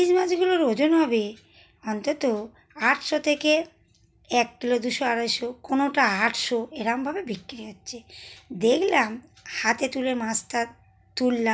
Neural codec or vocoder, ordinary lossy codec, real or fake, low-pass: none; none; real; none